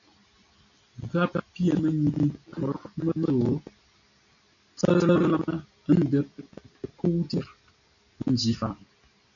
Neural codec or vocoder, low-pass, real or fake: none; 7.2 kHz; real